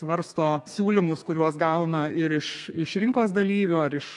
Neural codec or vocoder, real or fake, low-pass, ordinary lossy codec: codec, 32 kHz, 1.9 kbps, SNAC; fake; 10.8 kHz; AAC, 64 kbps